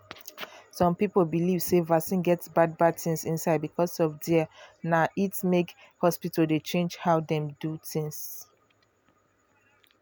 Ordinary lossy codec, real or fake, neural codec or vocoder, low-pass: none; real; none; none